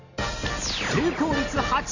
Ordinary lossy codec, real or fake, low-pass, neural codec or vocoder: none; real; 7.2 kHz; none